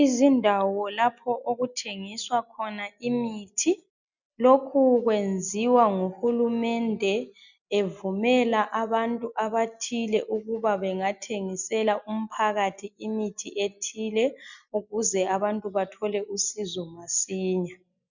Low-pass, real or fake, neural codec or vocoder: 7.2 kHz; real; none